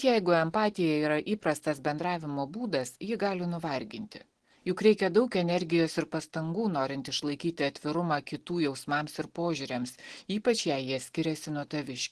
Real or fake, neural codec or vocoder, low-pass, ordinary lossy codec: real; none; 10.8 kHz; Opus, 16 kbps